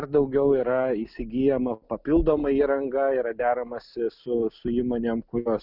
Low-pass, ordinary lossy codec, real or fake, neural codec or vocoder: 5.4 kHz; Opus, 64 kbps; real; none